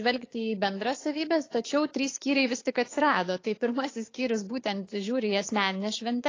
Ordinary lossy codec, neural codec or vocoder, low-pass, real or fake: AAC, 32 kbps; codec, 16 kHz, 6 kbps, DAC; 7.2 kHz; fake